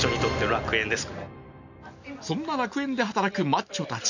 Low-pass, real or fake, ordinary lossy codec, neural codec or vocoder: 7.2 kHz; real; none; none